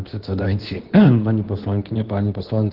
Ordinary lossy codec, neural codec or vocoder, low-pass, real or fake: Opus, 16 kbps; codec, 24 kHz, 0.9 kbps, WavTokenizer, medium speech release version 2; 5.4 kHz; fake